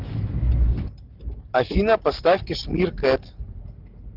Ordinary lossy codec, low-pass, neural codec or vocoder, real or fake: Opus, 32 kbps; 5.4 kHz; none; real